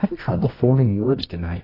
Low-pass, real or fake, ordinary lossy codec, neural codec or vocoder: 5.4 kHz; fake; AAC, 24 kbps; codec, 24 kHz, 0.9 kbps, WavTokenizer, medium music audio release